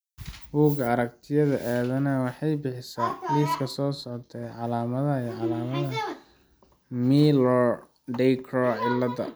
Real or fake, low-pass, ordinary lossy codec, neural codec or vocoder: real; none; none; none